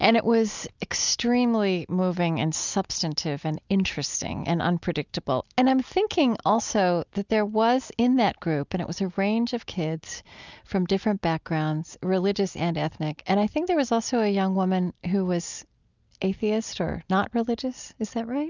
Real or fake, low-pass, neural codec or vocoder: real; 7.2 kHz; none